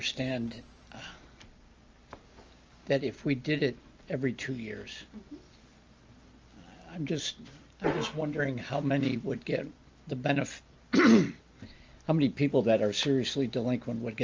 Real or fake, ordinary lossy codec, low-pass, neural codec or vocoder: real; Opus, 24 kbps; 7.2 kHz; none